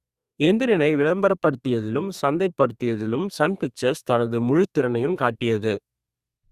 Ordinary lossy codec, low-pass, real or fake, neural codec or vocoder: Opus, 64 kbps; 14.4 kHz; fake; codec, 32 kHz, 1.9 kbps, SNAC